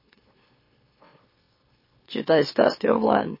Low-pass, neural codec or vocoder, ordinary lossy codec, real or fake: 5.4 kHz; autoencoder, 44.1 kHz, a latent of 192 numbers a frame, MeloTTS; MP3, 24 kbps; fake